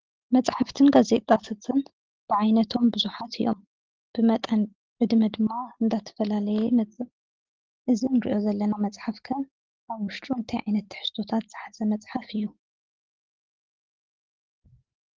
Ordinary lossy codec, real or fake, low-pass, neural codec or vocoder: Opus, 16 kbps; real; 7.2 kHz; none